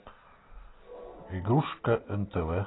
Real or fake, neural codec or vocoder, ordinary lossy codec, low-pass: real; none; AAC, 16 kbps; 7.2 kHz